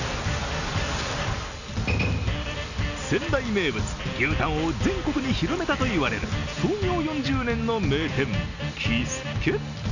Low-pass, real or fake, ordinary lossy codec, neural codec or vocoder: 7.2 kHz; real; none; none